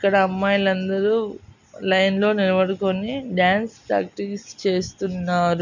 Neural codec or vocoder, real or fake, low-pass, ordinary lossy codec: none; real; 7.2 kHz; none